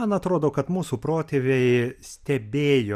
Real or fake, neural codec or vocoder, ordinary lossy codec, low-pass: fake; vocoder, 44.1 kHz, 128 mel bands every 256 samples, BigVGAN v2; Opus, 64 kbps; 14.4 kHz